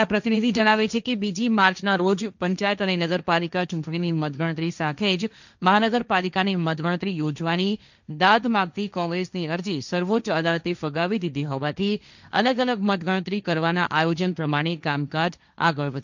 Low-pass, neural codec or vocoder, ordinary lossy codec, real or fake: 7.2 kHz; codec, 16 kHz, 1.1 kbps, Voila-Tokenizer; none; fake